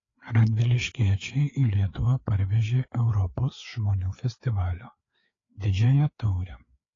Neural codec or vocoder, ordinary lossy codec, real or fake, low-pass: codec, 16 kHz, 4 kbps, FreqCodec, larger model; AAC, 32 kbps; fake; 7.2 kHz